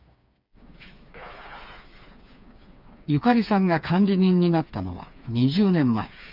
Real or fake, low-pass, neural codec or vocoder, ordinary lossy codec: fake; 5.4 kHz; codec, 16 kHz, 4 kbps, FreqCodec, smaller model; none